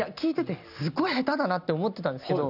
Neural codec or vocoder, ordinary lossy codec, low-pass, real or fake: none; none; 5.4 kHz; real